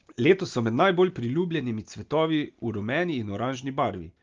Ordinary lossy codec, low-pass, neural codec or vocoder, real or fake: Opus, 32 kbps; 7.2 kHz; none; real